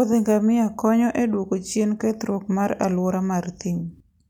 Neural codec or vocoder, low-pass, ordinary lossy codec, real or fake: none; 19.8 kHz; none; real